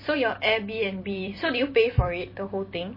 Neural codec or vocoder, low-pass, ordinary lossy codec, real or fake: vocoder, 44.1 kHz, 128 mel bands every 256 samples, BigVGAN v2; 5.4 kHz; MP3, 24 kbps; fake